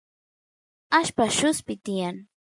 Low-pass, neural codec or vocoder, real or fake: 10.8 kHz; none; real